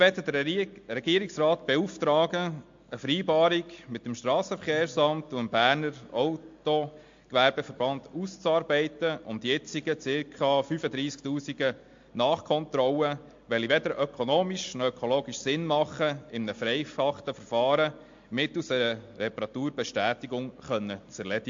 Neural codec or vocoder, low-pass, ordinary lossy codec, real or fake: none; 7.2 kHz; MP3, 48 kbps; real